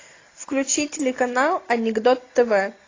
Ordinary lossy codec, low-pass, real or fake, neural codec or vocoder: AAC, 32 kbps; 7.2 kHz; real; none